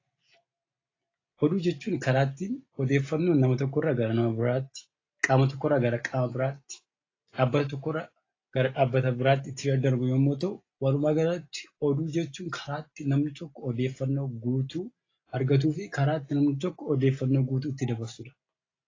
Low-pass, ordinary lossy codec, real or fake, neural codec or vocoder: 7.2 kHz; AAC, 32 kbps; real; none